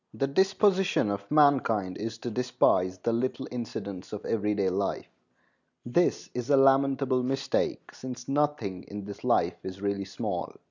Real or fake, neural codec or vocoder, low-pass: real; none; 7.2 kHz